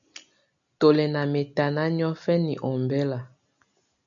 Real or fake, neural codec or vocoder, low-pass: real; none; 7.2 kHz